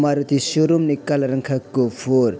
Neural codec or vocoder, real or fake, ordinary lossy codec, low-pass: none; real; none; none